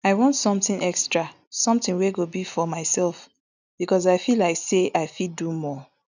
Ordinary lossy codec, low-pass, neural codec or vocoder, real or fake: none; 7.2 kHz; none; real